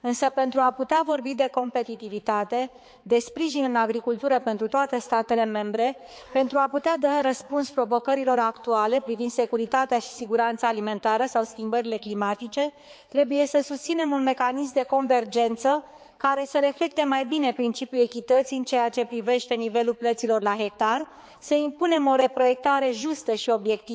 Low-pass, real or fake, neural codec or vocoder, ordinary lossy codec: none; fake; codec, 16 kHz, 4 kbps, X-Codec, HuBERT features, trained on balanced general audio; none